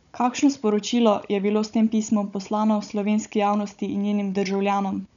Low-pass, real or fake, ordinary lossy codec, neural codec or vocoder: 7.2 kHz; fake; none; codec, 16 kHz, 16 kbps, FunCodec, trained on Chinese and English, 50 frames a second